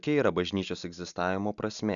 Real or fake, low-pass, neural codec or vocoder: real; 7.2 kHz; none